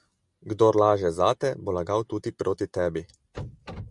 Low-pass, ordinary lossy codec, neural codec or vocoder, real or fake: 10.8 kHz; Opus, 64 kbps; none; real